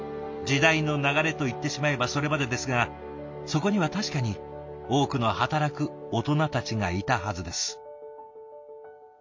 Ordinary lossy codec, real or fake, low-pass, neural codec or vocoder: MP3, 48 kbps; real; 7.2 kHz; none